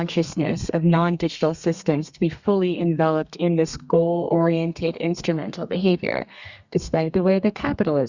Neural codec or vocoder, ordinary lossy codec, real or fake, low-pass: codec, 32 kHz, 1.9 kbps, SNAC; Opus, 64 kbps; fake; 7.2 kHz